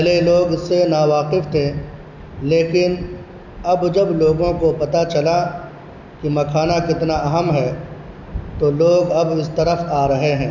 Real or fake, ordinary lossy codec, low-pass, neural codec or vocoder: real; none; 7.2 kHz; none